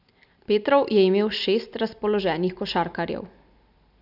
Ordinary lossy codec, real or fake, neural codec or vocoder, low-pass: none; real; none; 5.4 kHz